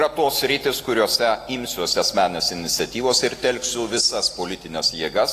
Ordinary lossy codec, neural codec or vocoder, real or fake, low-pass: AAC, 48 kbps; none; real; 14.4 kHz